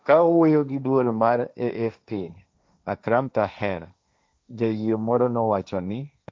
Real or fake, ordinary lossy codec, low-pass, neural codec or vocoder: fake; none; none; codec, 16 kHz, 1.1 kbps, Voila-Tokenizer